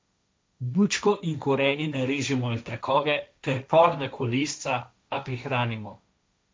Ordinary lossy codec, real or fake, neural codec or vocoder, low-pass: none; fake; codec, 16 kHz, 1.1 kbps, Voila-Tokenizer; none